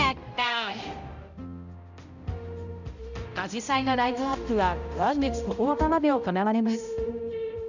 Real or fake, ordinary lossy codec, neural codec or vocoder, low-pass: fake; none; codec, 16 kHz, 0.5 kbps, X-Codec, HuBERT features, trained on balanced general audio; 7.2 kHz